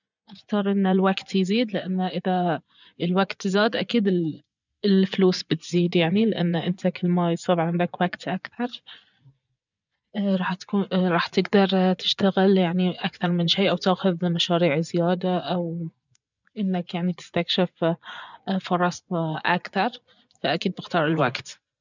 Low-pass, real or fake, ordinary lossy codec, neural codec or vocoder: 7.2 kHz; fake; none; vocoder, 24 kHz, 100 mel bands, Vocos